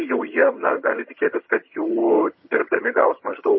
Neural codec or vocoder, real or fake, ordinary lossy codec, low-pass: vocoder, 22.05 kHz, 80 mel bands, HiFi-GAN; fake; MP3, 24 kbps; 7.2 kHz